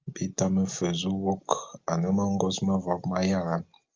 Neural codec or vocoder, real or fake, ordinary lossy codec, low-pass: none; real; Opus, 24 kbps; 7.2 kHz